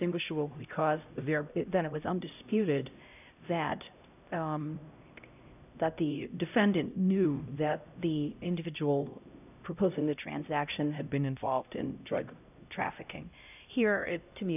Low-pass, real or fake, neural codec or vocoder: 3.6 kHz; fake; codec, 16 kHz, 0.5 kbps, X-Codec, HuBERT features, trained on LibriSpeech